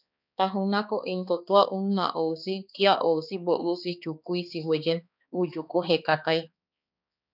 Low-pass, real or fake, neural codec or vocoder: 5.4 kHz; fake; codec, 16 kHz, 2 kbps, X-Codec, HuBERT features, trained on balanced general audio